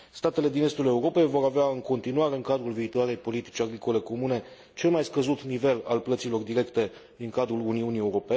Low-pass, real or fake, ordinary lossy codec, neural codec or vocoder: none; real; none; none